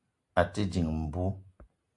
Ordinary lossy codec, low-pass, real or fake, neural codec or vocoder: AAC, 64 kbps; 10.8 kHz; real; none